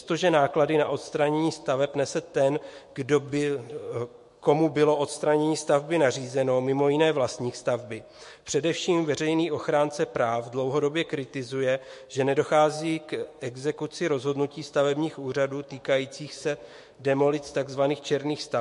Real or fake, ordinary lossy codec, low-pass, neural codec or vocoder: fake; MP3, 48 kbps; 14.4 kHz; autoencoder, 48 kHz, 128 numbers a frame, DAC-VAE, trained on Japanese speech